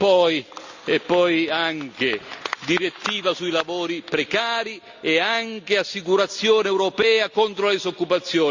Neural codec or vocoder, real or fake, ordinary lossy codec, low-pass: none; real; Opus, 64 kbps; 7.2 kHz